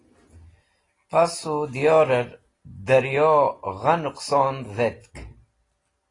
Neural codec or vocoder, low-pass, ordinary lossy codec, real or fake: none; 10.8 kHz; AAC, 32 kbps; real